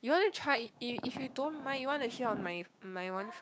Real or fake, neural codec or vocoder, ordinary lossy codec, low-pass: fake; codec, 16 kHz, 6 kbps, DAC; none; none